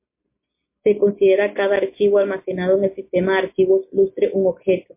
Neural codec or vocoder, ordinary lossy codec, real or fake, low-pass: none; MP3, 24 kbps; real; 3.6 kHz